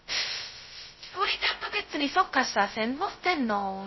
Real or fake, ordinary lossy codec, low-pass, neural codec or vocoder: fake; MP3, 24 kbps; 7.2 kHz; codec, 16 kHz, 0.2 kbps, FocalCodec